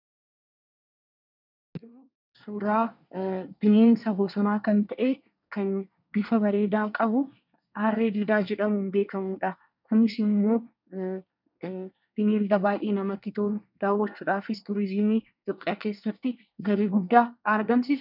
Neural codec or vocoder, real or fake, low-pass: codec, 24 kHz, 1 kbps, SNAC; fake; 5.4 kHz